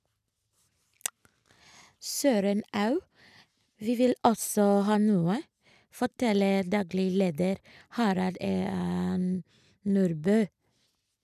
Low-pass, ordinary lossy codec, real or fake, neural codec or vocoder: 14.4 kHz; none; real; none